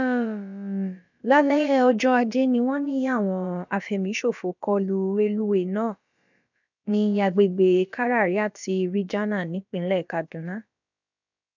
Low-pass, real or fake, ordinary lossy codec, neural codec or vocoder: 7.2 kHz; fake; none; codec, 16 kHz, about 1 kbps, DyCAST, with the encoder's durations